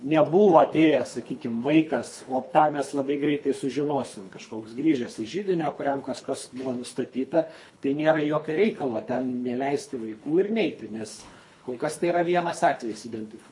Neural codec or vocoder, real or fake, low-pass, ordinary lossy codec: codec, 24 kHz, 3 kbps, HILCodec; fake; 10.8 kHz; MP3, 48 kbps